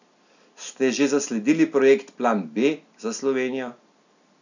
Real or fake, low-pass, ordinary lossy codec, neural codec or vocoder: real; 7.2 kHz; none; none